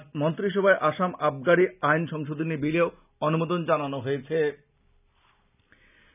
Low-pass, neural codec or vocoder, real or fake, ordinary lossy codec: 3.6 kHz; none; real; none